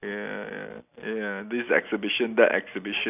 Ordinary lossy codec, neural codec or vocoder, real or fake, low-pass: none; none; real; 3.6 kHz